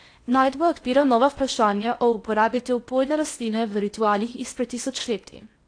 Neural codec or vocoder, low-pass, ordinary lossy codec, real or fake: codec, 16 kHz in and 24 kHz out, 0.8 kbps, FocalCodec, streaming, 65536 codes; 9.9 kHz; AAC, 48 kbps; fake